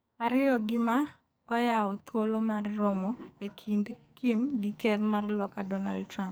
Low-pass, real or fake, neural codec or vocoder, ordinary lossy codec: none; fake; codec, 44.1 kHz, 2.6 kbps, SNAC; none